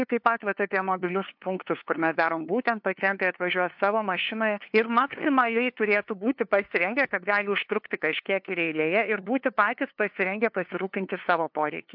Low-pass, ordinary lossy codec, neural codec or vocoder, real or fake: 5.4 kHz; MP3, 48 kbps; codec, 16 kHz, 2 kbps, FunCodec, trained on LibriTTS, 25 frames a second; fake